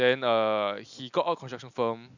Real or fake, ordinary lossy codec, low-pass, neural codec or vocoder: real; none; 7.2 kHz; none